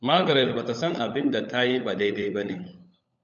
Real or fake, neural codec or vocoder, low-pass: fake; codec, 16 kHz, 16 kbps, FunCodec, trained on LibriTTS, 50 frames a second; 7.2 kHz